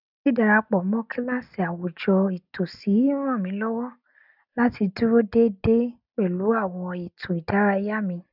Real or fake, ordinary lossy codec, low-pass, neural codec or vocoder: real; none; 5.4 kHz; none